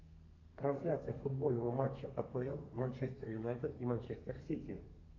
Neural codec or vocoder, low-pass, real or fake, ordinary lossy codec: codec, 32 kHz, 1.9 kbps, SNAC; 7.2 kHz; fake; AAC, 48 kbps